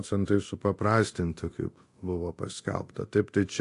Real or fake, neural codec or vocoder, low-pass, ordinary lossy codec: fake; codec, 24 kHz, 0.9 kbps, DualCodec; 10.8 kHz; AAC, 48 kbps